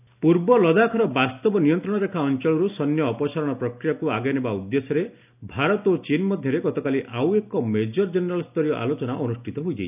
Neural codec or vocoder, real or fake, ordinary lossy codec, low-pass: none; real; none; 3.6 kHz